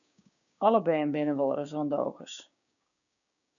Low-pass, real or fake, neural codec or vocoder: 7.2 kHz; fake; codec, 16 kHz, 6 kbps, DAC